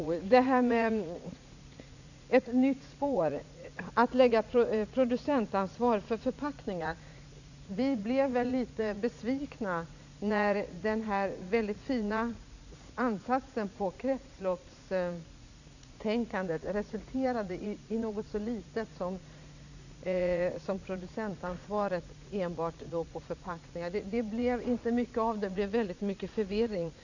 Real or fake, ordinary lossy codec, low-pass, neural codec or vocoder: fake; none; 7.2 kHz; vocoder, 44.1 kHz, 80 mel bands, Vocos